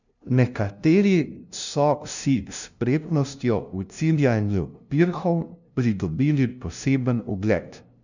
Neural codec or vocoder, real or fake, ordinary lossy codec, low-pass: codec, 16 kHz, 0.5 kbps, FunCodec, trained on LibriTTS, 25 frames a second; fake; none; 7.2 kHz